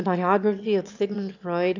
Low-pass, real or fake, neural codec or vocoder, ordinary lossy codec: 7.2 kHz; fake; autoencoder, 22.05 kHz, a latent of 192 numbers a frame, VITS, trained on one speaker; AAC, 48 kbps